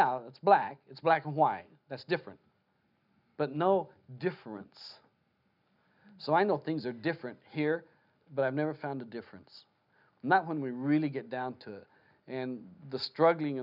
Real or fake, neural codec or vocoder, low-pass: real; none; 5.4 kHz